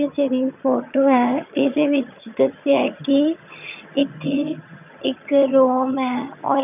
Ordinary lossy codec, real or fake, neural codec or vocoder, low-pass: none; fake; vocoder, 22.05 kHz, 80 mel bands, HiFi-GAN; 3.6 kHz